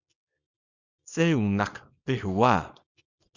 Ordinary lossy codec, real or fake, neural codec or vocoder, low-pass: Opus, 64 kbps; fake; codec, 24 kHz, 0.9 kbps, WavTokenizer, small release; 7.2 kHz